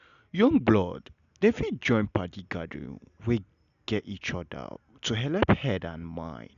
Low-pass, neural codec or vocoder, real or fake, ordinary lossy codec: 7.2 kHz; none; real; none